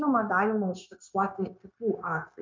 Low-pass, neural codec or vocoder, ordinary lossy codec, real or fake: 7.2 kHz; codec, 16 kHz, 0.9 kbps, LongCat-Audio-Codec; MP3, 64 kbps; fake